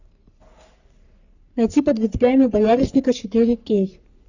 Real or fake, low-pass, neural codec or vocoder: fake; 7.2 kHz; codec, 44.1 kHz, 3.4 kbps, Pupu-Codec